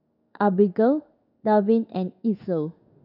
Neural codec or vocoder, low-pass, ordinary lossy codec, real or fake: codec, 16 kHz in and 24 kHz out, 1 kbps, XY-Tokenizer; 5.4 kHz; none; fake